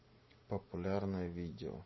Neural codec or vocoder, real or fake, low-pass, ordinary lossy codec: none; real; 7.2 kHz; MP3, 24 kbps